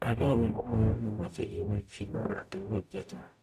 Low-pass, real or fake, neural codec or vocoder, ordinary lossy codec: 14.4 kHz; fake; codec, 44.1 kHz, 0.9 kbps, DAC; none